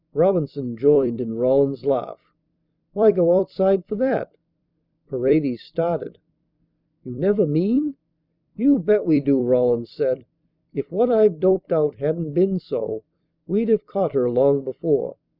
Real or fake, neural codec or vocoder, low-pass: fake; vocoder, 44.1 kHz, 128 mel bands every 256 samples, BigVGAN v2; 5.4 kHz